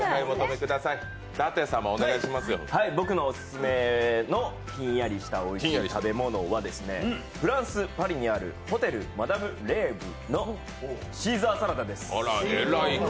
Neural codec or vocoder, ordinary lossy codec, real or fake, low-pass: none; none; real; none